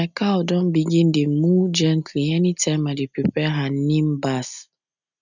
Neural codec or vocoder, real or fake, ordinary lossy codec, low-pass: none; real; none; 7.2 kHz